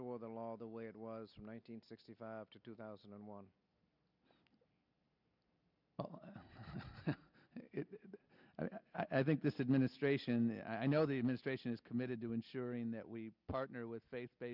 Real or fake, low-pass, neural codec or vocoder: real; 5.4 kHz; none